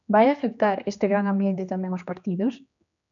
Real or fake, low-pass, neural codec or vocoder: fake; 7.2 kHz; codec, 16 kHz, 2 kbps, X-Codec, HuBERT features, trained on general audio